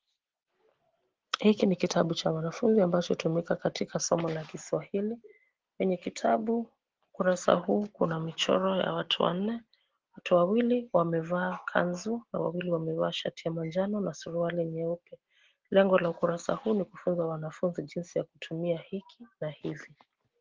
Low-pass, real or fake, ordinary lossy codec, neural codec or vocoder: 7.2 kHz; real; Opus, 16 kbps; none